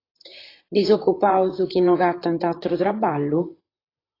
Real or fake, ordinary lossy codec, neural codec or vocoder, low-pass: fake; AAC, 24 kbps; vocoder, 44.1 kHz, 128 mel bands, Pupu-Vocoder; 5.4 kHz